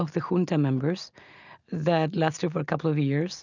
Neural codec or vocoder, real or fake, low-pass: none; real; 7.2 kHz